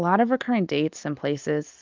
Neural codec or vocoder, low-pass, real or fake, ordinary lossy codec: none; 7.2 kHz; real; Opus, 24 kbps